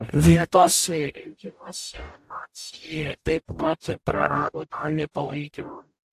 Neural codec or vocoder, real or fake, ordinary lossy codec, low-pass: codec, 44.1 kHz, 0.9 kbps, DAC; fake; AAC, 64 kbps; 14.4 kHz